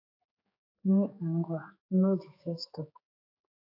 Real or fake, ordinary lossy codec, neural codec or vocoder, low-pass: fake; AAC, 32 kbps; codec, 16 kHz, 4 kbps, X-Codec, HuBERT features, trained on general audio; 5.4 kHz